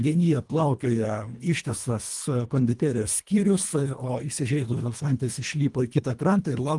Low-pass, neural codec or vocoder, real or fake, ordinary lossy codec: 10.8 kHz; codec, 24 kHz, 1.5 kbps, HILCodec; fake; Opus, 32 kbps